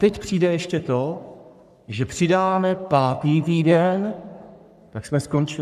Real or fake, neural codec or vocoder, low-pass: fake; codec, 44.1 kHz, 3.4 kbps, Pupu-Codec; 14.4 kHz